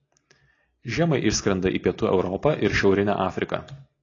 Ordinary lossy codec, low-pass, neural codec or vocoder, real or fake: AAC, 32 kbps; 7.2 kHz; none; real